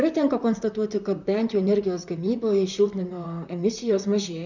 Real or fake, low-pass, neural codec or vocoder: fake; 7.2 kHz; vocoder, 44.1 kHz, 128 mel bands, Pupu-Vocoder